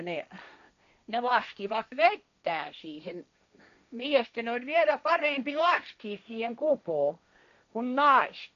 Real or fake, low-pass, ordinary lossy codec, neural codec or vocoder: fake; 7.2 kHz; none; codec, 16 kHz, 1.1 kbps, Voila-Tokenizer